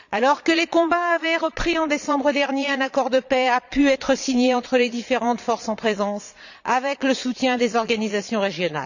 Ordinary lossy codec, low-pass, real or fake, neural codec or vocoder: none; 7.2 kHz; fake; vocoder, 22.05 kHz, 80 mel bands, Vocos